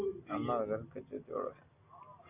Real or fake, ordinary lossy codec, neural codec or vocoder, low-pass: real; Opus, 64 kbps; none; 3.6 kHz